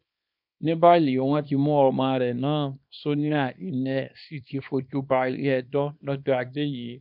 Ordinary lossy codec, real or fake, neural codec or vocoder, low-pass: none; fake; codec, 24 kHz, 0.9 kbps, WavTokenizer, small release; 5.4 kHz